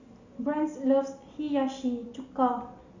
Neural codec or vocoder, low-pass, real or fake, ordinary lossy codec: none; 7.2 kHz; real; Opus, 64 kbps